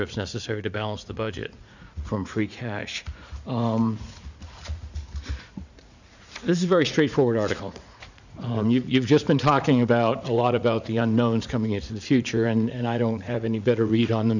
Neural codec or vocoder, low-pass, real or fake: vocoder, 44.1 kHz, 80 mel bands, Vocos; 7.2 kHz; fake